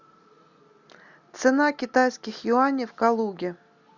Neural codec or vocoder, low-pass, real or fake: none; 7.2 kHz; real